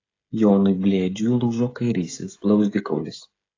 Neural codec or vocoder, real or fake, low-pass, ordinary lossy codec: codec, 16 kHz, 16 kbps, FreqCodec, smaller model; fake; 7.2 kHz; AAC, 32 kbps